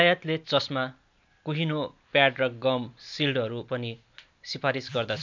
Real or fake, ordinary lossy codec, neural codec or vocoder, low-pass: real; MP3, 64 kbps; none; 7.2 kHz